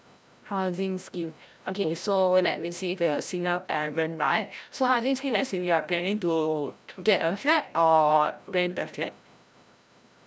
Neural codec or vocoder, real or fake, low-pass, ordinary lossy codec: codec, 16 kHz, 0.5 kbps, FreqCodec, larger model; fake; none; none